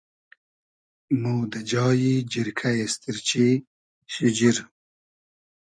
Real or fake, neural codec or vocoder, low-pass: real; none; 9.9 kHz